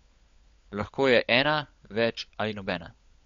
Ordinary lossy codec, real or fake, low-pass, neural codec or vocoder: MP3, 48 kbps; fake; 7.2 kHz; codec, 16 kHz, 16 kbps, FunCodec, trained on LibriTTS, 50 frames a second